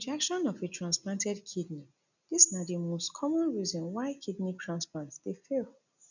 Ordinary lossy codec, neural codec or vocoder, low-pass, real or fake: none; none; 7.2 kHz; real